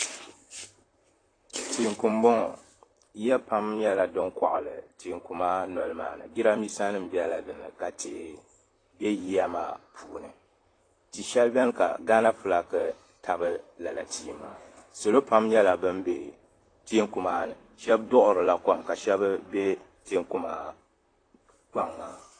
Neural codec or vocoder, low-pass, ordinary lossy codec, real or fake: vocoder, 44.1 kHz, 128 mel bands, Pupu-Vocoder; 9.9 kHz; AAC, 32 kbps; fake